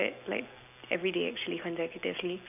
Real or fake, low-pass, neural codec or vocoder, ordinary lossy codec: real; 3.6 kHz; none; none